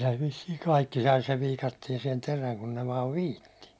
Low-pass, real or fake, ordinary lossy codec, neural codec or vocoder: none; real; none; none